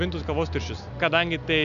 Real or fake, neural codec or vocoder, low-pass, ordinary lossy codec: real; none; 7.2 kHz; MP3, 96 kbps